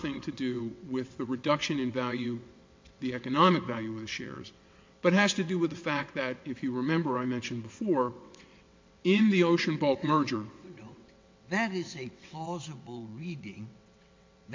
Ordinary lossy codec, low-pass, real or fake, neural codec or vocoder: MP3, 48 kbps; 7.2 kHz; fake; vocoder, 22.05 kHz, 80 mel bands, WaveNeXt